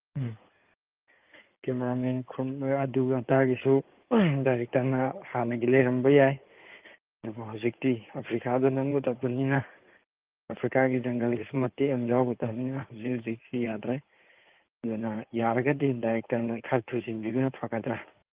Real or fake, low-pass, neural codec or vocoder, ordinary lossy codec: fake; 3.6 kHz; codec, 16 kHz in and 24 kHz out, 2.2 kbps, FireRedTTS-2 codec; Opus, 32 kbps